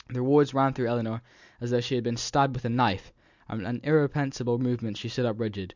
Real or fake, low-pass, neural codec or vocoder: real; 7.2 kHz; none